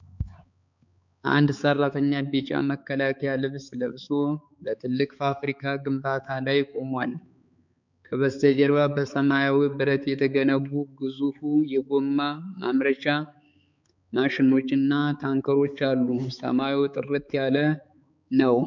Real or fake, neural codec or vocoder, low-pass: fake; codec, 16 kHz, 4 kbps, X-Codec, HuBERT features, trained on balanced general audio; 7.2 kHz